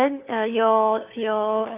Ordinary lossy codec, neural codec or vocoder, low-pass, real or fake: none; codec, 16 kHz, 4 kbps, FunCodec, trained on LibriTTS, 50 frames a second; 3.6 kHz; fake